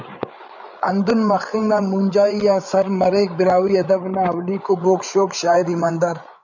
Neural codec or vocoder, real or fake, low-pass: codec, 16 kHz, 8 kbps, FreqCodec, larger model; fake; 7.2 kHz